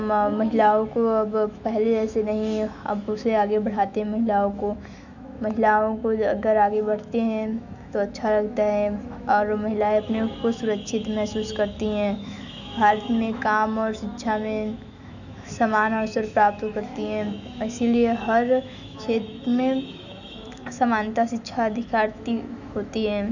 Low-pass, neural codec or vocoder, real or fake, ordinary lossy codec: 7.2 kHz; autoencoder, 48 kHz, 128 numbers a frame, DAC-VAE, trained on Japanese speech; fake; none